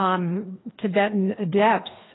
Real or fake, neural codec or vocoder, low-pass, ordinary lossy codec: fake; codec, 16 kHz, 2 kbps, FreqCodec, larger model; 7.2 kHz; AAC, 16 kbps